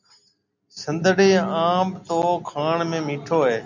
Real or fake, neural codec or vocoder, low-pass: real; none; 7.2 kHz